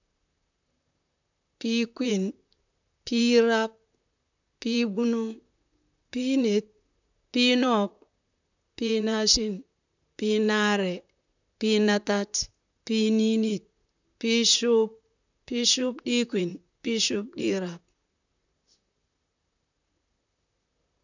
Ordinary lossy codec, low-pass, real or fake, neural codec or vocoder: none; 7.2 kHz; fake; vocoder, 44.1 kHz, 128 mel bands, Pupu-Vocoder